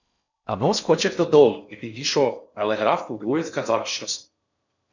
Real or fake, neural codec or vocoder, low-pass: fake; codec, 16 kHz in and 24 kHz out, 0.6 kbps, FocalCodec, streaming, 4096 codes; 7.2 kHz